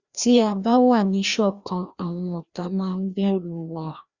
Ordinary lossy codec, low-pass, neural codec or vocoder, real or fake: Opus, 64 kbps; 7.2 kHz; codec, 16 kHz, 1 kbps, FreqCodec, larger model; fake